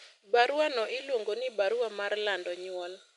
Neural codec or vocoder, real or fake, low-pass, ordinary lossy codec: none; real; 10.8 kHz; MP3, 96 kbps